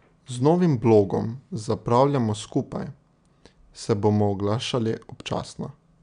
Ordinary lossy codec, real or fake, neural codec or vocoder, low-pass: none; real; none; 9.9 kHz